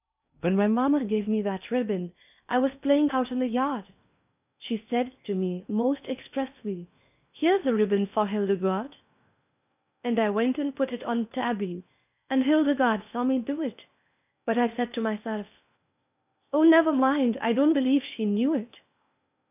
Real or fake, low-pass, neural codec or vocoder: fake; 3.6 kHz; codec, 16 kHz in and 24 kHz out, 0.6 kbps, FocalCodec, streaming, 2048 codes